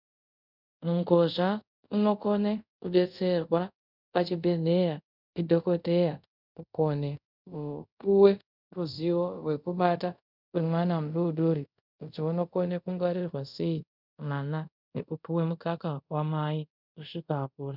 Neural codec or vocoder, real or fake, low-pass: codec, 24 kHz, 0.5 kbps, DualCodec; fake; 5.4 kHz